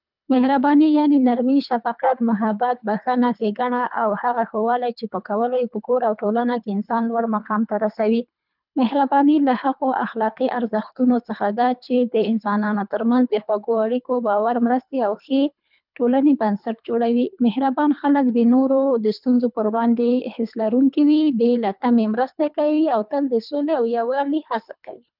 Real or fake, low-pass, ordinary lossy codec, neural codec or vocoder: fake; 5.4 kHz; none; codec, 24 kHz, 3 kbps, HILCodec